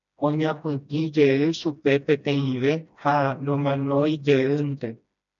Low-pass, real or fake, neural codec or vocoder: 7.2 kHz; fake; codec, 16 kHz, 1 kbps, FreqCodec, smaller model